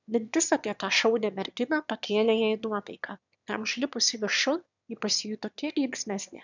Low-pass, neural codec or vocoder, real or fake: 7.2 kHz; autoencoder, 22.05 kHz, a latent of 192 numbers a frame, VITS, trained on one speaker; fake